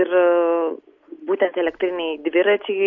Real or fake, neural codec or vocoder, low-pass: real; none; 7.2 kHz